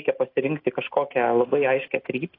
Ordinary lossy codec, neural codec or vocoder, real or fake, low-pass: AAC, 24 kbps; none; real; 5.4 kHz